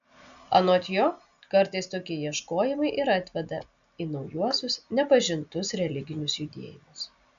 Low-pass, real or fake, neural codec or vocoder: 7.2 kHz; real; none